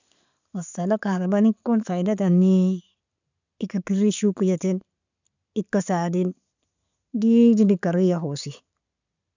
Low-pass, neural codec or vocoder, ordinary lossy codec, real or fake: 7.2 kHz; none; none; real